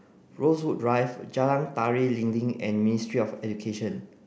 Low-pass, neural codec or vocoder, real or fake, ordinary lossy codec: none; none; real; none